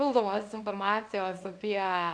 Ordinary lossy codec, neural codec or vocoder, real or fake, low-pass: MP3, 64 kbps; codec, 24 kHz, 0.9 kbps, WavTokenizer, small release; fake; 9.9 kHz